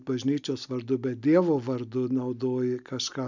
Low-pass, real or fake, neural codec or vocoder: 7.2 kHz; real; none